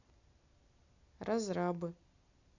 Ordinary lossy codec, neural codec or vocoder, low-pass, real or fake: none; none; 7.2 kHz; real